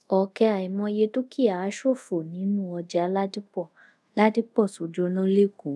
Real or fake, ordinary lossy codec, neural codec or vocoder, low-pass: fake; none; codec, 24 kHz, 0.5 kbps, DualCodec; none